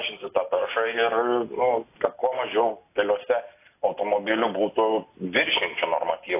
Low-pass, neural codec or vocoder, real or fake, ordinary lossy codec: 3.6 kHz; none; real; AAC, 24 kbps